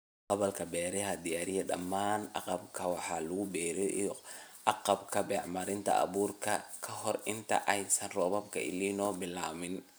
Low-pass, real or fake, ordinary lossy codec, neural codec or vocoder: none; real; none; none